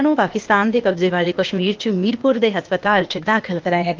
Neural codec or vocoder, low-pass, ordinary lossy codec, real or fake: codec, 16 kHz, 0.8 kbps, ZipCodec; 7.2 kHz; Opus, 24 kbps; fake